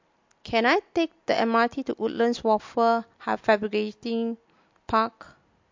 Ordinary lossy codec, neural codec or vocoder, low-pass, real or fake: MP3, 48 kbps; none; 7.2 kHz; real